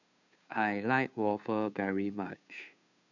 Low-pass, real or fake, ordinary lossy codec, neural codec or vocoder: 7.2 kHz; fake; none; codec, 16 kHz, 2 kbps, FunCodec, trained on Chinese and English, 25 frames a second